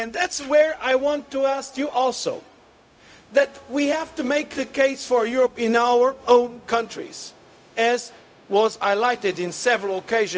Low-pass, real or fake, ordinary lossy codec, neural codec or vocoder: none; fake; none; codec, 16 kHz, 0.4 kbps, LongCat-Audio-Codec